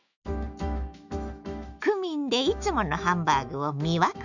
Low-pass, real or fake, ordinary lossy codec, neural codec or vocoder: 7.2 kHz; fake; none; autoencoder, 48 kHz, 128 numbers a frame, DAC-VAE, trained on Japanese speech